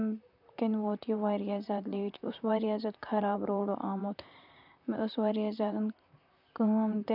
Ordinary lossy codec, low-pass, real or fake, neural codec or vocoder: none; 5.4 kHz; fake; vocoder, 44.1 kHz, 128 mel bands, Pupu-Vocoder